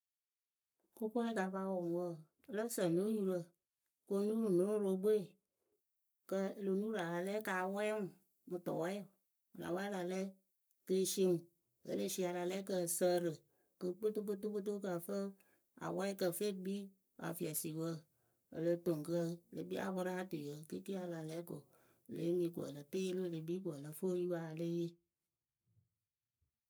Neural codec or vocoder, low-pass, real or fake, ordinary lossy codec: codec, 44.1 kHz, 7.8 kbps, Pupu-Codec; none; fake; none